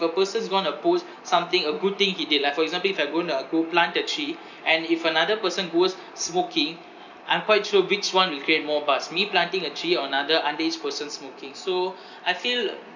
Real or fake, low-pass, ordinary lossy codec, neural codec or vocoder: real; 7.2 kHz; none; none